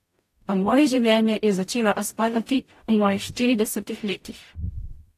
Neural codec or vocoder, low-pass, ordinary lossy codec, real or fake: codec, 44.1 kHz, 0.9 kbps, DAC; 14.4 kHz; AAC, 64 kbps; fake